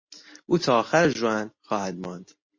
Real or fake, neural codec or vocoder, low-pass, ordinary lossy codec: real; none; 7.2 kHz; MP3, 32 kbps